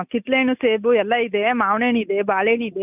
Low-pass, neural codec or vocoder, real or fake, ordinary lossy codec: 3.6 kHz; none; real; none